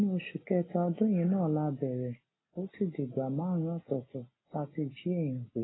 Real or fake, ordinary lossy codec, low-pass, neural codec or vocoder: real; AAC, 16 kbps; 7.2 kHz; none